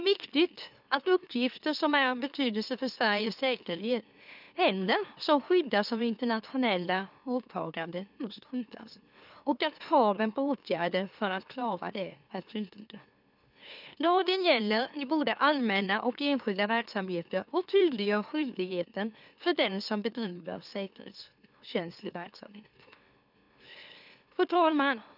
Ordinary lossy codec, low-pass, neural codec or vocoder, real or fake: none; 5.4 kHz; autoencoder, 44.1 kHz, a latent of 192 numbers a frame, MeloTTS; fake